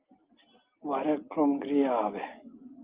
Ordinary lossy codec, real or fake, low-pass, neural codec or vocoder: Opus, 32 kbps; real; 3.6 kHz; none